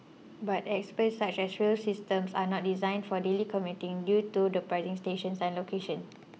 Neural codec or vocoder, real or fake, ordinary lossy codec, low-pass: none; real; none; none